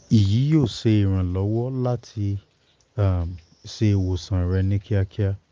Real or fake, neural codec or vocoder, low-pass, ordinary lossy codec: real; none; 7.2 kHz; Opus, 24 kbps